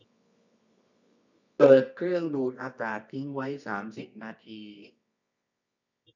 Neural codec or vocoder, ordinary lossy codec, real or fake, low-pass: codec, 24 kHz, 0.9 kbps, WavTokenizer, medium music audio release; none; fake; 7.2 kHz